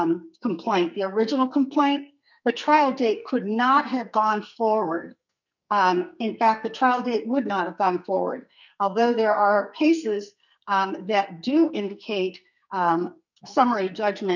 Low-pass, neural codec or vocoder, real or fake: 7.2 kHz; codec, 44.1 kHz, 2.6 kbps, SNAC; fake